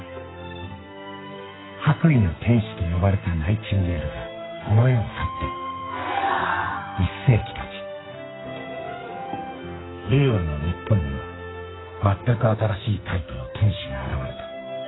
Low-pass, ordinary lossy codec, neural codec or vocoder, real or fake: 7.2 kHz; AAC, 16 kbps; codec, 44.1 kHz, 2.6 kbps, SNAC; fake